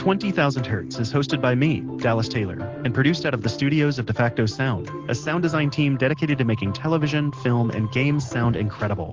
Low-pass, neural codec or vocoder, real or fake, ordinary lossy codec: 7.2 kHz; none; real; Opus, 16 kbps